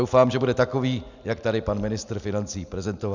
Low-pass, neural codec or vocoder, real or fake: 7.2 kHz; none; real